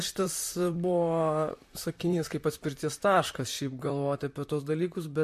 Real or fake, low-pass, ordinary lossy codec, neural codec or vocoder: fake; 14.4 kHz; MP3, 64 kbps; vocoder, 44.1 kHz, 128 mel bands, Pupu-Vocoder